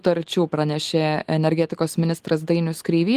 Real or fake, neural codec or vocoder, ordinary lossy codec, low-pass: real; none; Opus, 24 kbps; 14.4 kHz